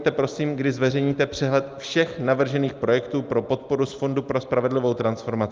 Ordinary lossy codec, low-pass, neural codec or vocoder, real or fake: Opus, 24 kbps; 7.2 kHz; none; real